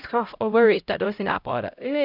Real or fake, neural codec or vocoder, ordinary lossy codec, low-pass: fake; codec, 16 kHz, 0.5 kbps, X-Codec, HuBERT features, trained on LibriSpeech; none; 5.4 kHz